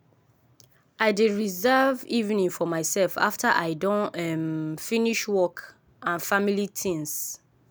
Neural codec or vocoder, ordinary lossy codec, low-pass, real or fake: none; none; none; real